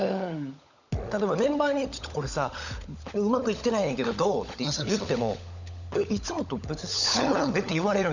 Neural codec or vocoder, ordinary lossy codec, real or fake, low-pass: codec, 16 kHz, 16 kbps, FunCodec, trained on LibriTTS, 50 frames a second; none; fake; 7.2 kHz